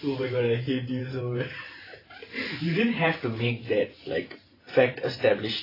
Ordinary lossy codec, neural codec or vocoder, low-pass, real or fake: AAC, 24 kbps; vocoder, 44.1 kHz, 128 mel bands every 512 samples, BigVGAN v2; 5.4 kHz; fake